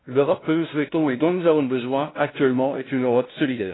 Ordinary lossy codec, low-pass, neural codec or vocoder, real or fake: AAC, 16 kbps; 7.2 kHz; codec, 16 kHz, 0.5 kbps, FunCodec, trained on LibriTTS, 25 frames a second; fake